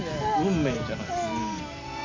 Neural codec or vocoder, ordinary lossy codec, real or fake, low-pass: none; MP3, 64 kbps; real; 7.2 kHz